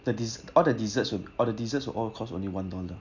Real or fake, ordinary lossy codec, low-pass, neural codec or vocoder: real; none; 7.2 kHz; none